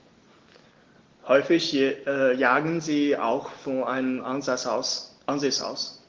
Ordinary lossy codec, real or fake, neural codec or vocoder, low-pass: Opus, 16 kbps; real; none; 7.2 kHz